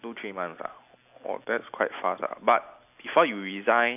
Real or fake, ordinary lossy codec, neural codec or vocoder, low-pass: real; none; none; 3.6 kHz